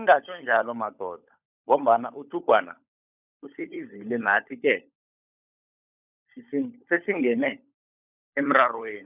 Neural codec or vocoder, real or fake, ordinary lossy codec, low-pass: codec, 16 kHz, 16 kbps, FunCodec, trained on LibriTTS, 50 frames a second; fake; none; 3.6 kHz